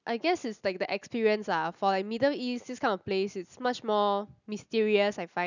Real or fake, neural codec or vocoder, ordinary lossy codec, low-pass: real; none; none; 7.2 kHz